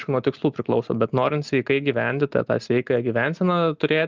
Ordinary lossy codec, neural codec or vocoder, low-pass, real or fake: Opus, 32 kbps; none; 7.2 kHz; real